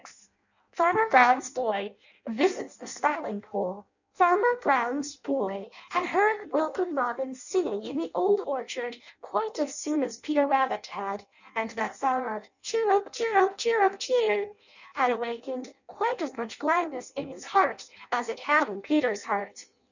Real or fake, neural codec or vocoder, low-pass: fake; codec, 16 kHz in and 24 kHz out, 0.6 kbps, FireRedTTS-2 codec; 7.2 kHz